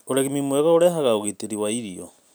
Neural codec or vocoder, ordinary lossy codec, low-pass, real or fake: none; none; none; real